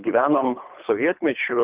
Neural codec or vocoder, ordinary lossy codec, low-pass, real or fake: codec, 24 kHz, 3 kbps, HILCodec; Opus, 32 kbps; 3.6 kHz; fake